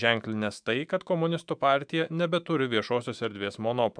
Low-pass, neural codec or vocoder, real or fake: 9.9 kHz; autoencoder, 48 kHz, 128 numbers a frame, DAC-VAE, trained on Japanese speech; fake